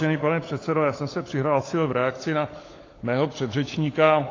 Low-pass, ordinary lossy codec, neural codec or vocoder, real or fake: 7.2 kHz; AAC, 32 kbps; codec, 16 kHz, 16 kbps, FunCodec, trained on LibriTTS, 50 frames a second; fake